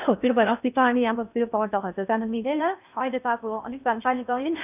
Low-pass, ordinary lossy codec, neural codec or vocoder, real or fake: 3.6 kHz; none; codec, 16 kHz in and 24 kHz out, 0.6 kbps, FocalCodec, streaming, 2048 codes; fake